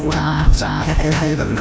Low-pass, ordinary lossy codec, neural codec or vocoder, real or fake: none; none; codec, 16 kHz, 0.5 kbps, FreqCodec, larger model; fake